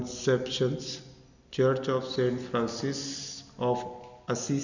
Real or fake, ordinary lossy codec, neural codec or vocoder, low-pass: real; none; none; 7.2 kHz